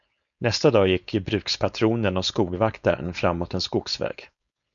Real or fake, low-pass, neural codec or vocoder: fake; 7.2 kHz; codec, 16 kHz, 4.8 kbps, FACodec